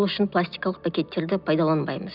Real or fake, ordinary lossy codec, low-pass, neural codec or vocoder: real; none; 5.4 kHz; none